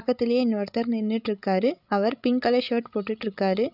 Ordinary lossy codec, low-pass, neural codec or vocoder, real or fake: none; 5.4 kHz; codec, 16 kHz, 16 kbps, FreqCodec, larger model; fake